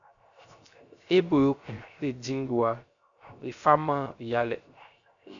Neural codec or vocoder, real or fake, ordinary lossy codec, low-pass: codec, 16 kHz, 0.3 kbps, FocalCodec; fake; MP3, 96 kbps; 7.2 kHz